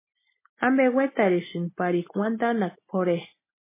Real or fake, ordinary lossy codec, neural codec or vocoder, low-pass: real; MP3, 16 kbps; none; 3.6 kHz